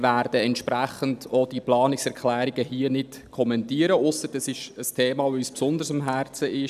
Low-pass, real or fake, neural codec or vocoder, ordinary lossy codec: 14.4 kHz; real; none; none